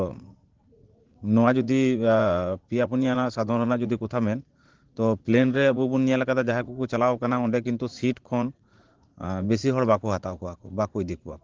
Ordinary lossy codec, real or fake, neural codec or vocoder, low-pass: Opus, 16 kbps; fake; vocoder, 44.1 kHz, 80 mel bands, Vocos; 7.2 kHz